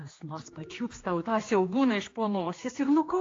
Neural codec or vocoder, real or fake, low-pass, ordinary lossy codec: codec, 16 kHz, 2 kbps, X-Codec, HuBERT features, trained on general audio; fake; 7.2 kHz; AAC, 32 kbps